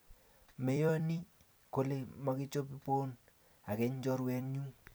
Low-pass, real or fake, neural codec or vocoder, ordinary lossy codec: none; fake; vocoder, 44.1 kHz, 128 mel bands every 256 samples, BigVGAN v2; none